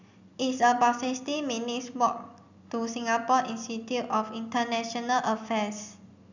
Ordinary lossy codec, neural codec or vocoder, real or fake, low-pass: none; none; real; 7.2 kHz